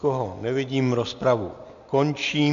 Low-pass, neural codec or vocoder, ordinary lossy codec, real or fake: 7.2 kHz; none; AAC, 64 kbps; real